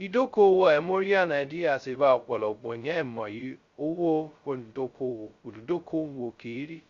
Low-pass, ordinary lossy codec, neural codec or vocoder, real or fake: 7.2 kHz; Opus, 64 kbps; codec, 16 kHz, 0.2 kbps, FocalCodec; fake